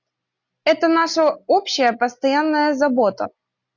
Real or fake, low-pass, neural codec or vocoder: real; 7.2 kHz; none